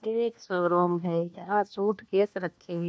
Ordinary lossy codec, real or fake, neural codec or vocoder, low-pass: none; fake; codec, 16 kHz, 1 kbps, FunCodec, trained on LibriTTS, 50 frames a second; none